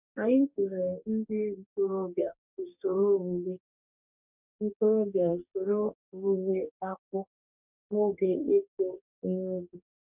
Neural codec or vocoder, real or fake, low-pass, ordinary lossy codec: codec, 44.1 kHz, 2.6 kbps, DAC; fake; 3.6 kHz; none